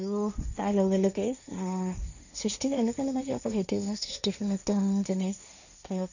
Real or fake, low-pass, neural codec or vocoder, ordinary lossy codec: fake; 7.2 kHz; codec, 16 kHz, 1.1 kbps, Voila-Tokenizer; none